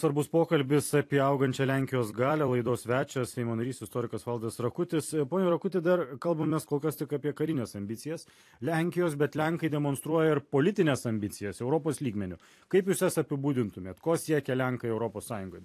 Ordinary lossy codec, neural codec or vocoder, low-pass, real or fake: AAC, 64 kbps; vocoder, 44.1 kHz, 128 mel bands every 256 samples, BigVGAN v2; 14.4 kHz; fake